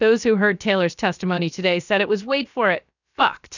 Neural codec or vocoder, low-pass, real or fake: codec, 16 kHz, about 1 kbps, DyCAST, with the encoder's durations; 7.2 kHz; fake